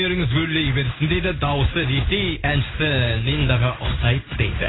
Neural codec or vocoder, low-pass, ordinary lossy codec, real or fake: codec, 16 kHz in and 24 kHz out, 1 kbps, XY-Tokenizer; 7.2 kHz; AAC, 16 kbps; fake